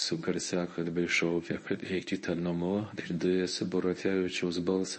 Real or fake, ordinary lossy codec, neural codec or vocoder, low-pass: fake; MP3, 32 kbps; codec, 24 kHz, 0.9 kbps, WavTokenizer, medium speech release version 1; 9.9 kHz